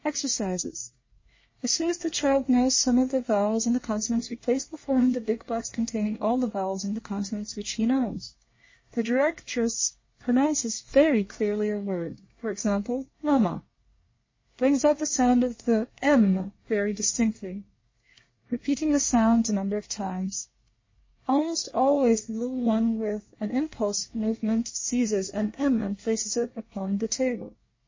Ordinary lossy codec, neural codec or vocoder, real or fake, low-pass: MP3, 32 kbps; codec, 24 kHz, 1 kbps, SNAC; fake; 7.2 kHz